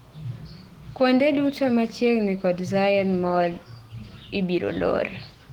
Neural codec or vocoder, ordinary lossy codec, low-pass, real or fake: autoencoder, 48 kHz, 128 numbers a frame, DAC-VAE, trained on Japanese speech; Opus, 16 kbps; 19.8 kHz; fake